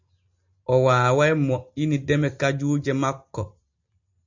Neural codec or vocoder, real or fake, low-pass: none; real; 7.2 kHz